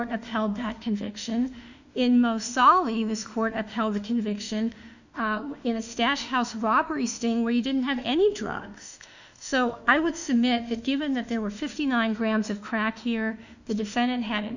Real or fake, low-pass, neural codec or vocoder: fake; 7.2 kHz; autoencoder, 48 kHz, 32 numbers a frame, DAC-VAE, trained on Japanese speech